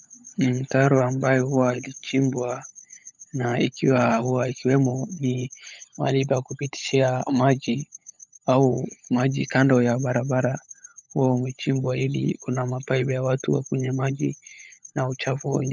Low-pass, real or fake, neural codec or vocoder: 7.2 kHz; fake; codec, 16 kHz, 16 kbps, FunCodec, trained on LibriTTS, 50 frames a second